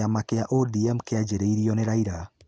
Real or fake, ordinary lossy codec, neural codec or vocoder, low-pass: real; none; none; none